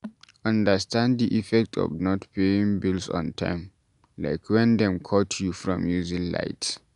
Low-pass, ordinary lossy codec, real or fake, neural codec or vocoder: 10.8 kHz; none; real; none